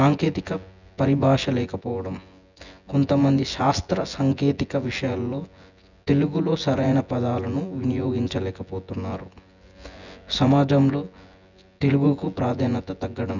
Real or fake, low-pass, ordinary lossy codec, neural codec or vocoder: fake; 7.2 kHz; none; vocoder, 24 kHz, 100 mel bands, Vocos